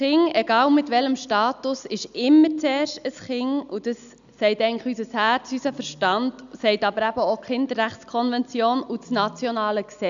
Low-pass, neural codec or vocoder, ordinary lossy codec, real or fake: 7.2 kHz; none; none; real